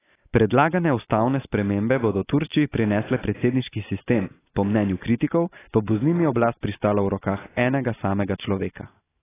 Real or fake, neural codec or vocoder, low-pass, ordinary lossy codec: real; none; 3.6 kHz; AAC, 16 kbps